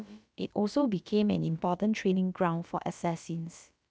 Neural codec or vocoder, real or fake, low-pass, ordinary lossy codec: codec, 16 kHz, about 1 kbps, DyCAST, with the encoder's durations; fake; none; none